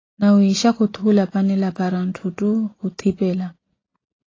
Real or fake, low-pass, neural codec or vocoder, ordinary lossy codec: real; 7.2 kHz; none; AAC, 32 kbps